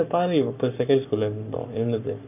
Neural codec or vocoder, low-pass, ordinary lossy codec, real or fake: codec, 44.1 kHz, 7.8 kbps, Pupu-Codec; 3.6 kHz; none; fake